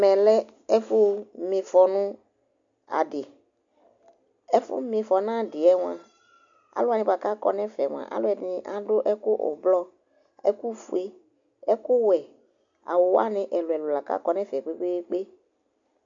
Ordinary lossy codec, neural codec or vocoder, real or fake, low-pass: AAC, 64 kbps; none; real; 7.2 kHz